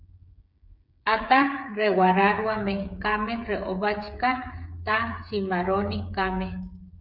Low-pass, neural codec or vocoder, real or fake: 5.4 kHz; codec, 16 kHz, 8 kbps, FreqCodec, smaller model; fake